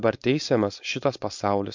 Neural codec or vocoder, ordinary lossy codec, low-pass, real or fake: codec, 16 kHz, 4.8 kbps, FACodec; MP3, 64 kbps; 7.2 kHz; fake